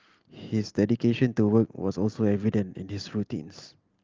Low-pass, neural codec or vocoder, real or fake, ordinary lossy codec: 7.2 kHz; none; real; Opus, 24 kbps